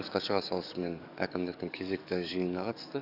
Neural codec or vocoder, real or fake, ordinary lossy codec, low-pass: codec, 44.1 kHz, 7.8 kbps, DAC; fake; none; 5.4 kHz